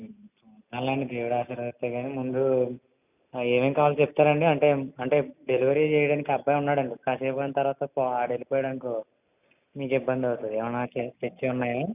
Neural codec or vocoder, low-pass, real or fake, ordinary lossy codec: none; 3.6 kHz; real; none